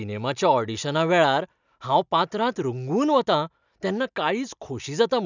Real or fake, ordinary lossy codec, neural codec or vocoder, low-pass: real; none; none; 7.2 kHz